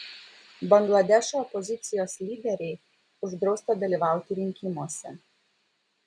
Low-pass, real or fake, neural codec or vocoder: 9.9 kHz; real; none